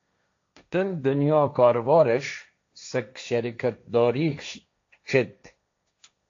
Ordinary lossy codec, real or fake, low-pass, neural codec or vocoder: AAC, 48 kbps; fake; 7.2 kHz; codec, 16 kHz, 1.1 kbps, Voila-Tokenizer